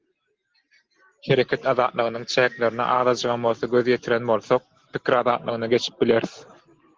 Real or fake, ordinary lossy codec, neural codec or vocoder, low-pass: real; Opus, 16 kbps; none; 7.2 kHz